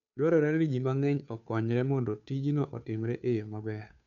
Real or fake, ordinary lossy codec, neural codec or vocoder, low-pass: fake; none; codec, 16 kHz, 2 kbps, FunCodec, trained on Chinese and English, 25 frames a second; 7.2 kHz